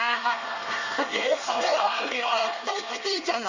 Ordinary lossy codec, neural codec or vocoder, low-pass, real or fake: Opus, 64 kbps; codec, 24 kHz, 1 kbps, SNAC; 7.2 kHz; fake